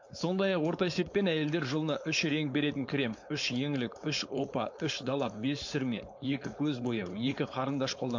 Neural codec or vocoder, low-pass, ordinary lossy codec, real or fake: codec, 16 kHz, 4.8 kbps, FACodec; 7.2 kHz; MP3, 48 kbps; fake